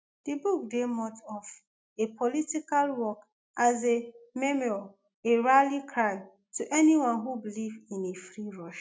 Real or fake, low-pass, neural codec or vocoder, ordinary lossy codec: real; none; none; none